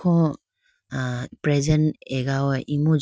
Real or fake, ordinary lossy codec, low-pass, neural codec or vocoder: real; none; none; none